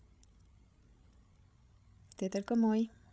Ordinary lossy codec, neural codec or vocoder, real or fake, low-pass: none; codec, 16 kHz, 16 kbps, FreqCodec, larger model; fake; none